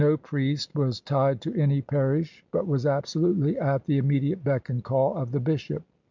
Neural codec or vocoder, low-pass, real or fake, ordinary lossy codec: none; 7.2 kHz; real; MP3, 64 kbps